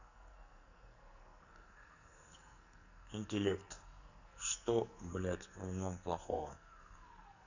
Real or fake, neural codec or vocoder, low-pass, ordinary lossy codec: fake; codec, 44.1 kHz, 2.6 kbps, SNAC; 7.2 kHz; none